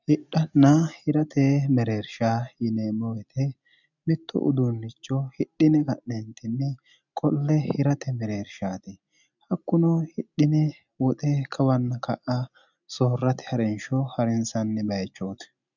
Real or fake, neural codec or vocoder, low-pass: real; none; 7.2 kHz